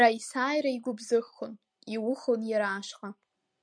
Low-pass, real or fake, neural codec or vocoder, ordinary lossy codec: 9.9 kHz; real; none; MP3, 96 kbps